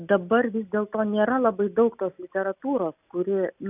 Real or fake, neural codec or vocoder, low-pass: real; none; 3.6 kHz